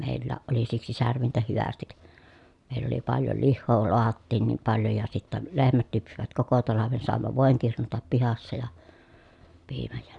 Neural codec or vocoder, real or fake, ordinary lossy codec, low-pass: none; real; none; 10.8 kHz